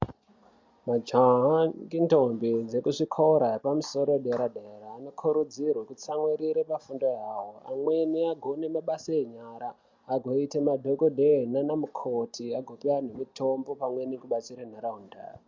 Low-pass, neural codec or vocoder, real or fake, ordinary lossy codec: 7.2 kHz; none; real; MP3, 64 kbps